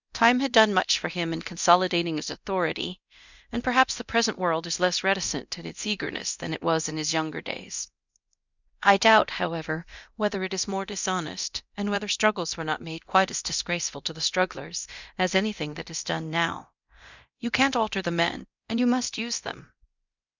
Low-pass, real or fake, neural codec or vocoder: 7.2 kHz; fake; codec, 24 kHz, 0.9 kbps, DualCodec